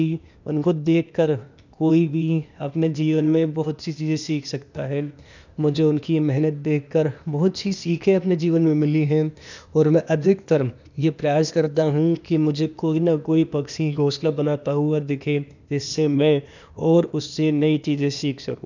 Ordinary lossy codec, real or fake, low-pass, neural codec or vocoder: none; fake; 7.2 kHz; codec, 16 kHz, 0.8 kbps, ZipCodec